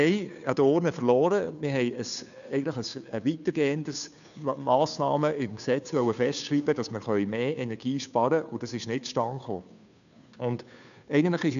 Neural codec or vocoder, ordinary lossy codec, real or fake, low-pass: codec, 16 kHz, 2 kbps, FunCodec, trained on Chinese and English, 25 frames a second; none; fake; 7.2 kHz